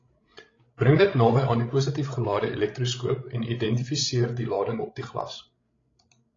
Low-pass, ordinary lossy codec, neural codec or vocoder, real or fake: 7.2 kHz; AAC, 32 kbps; codec, 16 kHz, 16 kbps, FreqCodec, larger model; fake